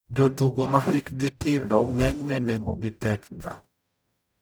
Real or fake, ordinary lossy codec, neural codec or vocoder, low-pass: fake; none; codec, 44.1 kHz, 0.9 kbps, DAC; none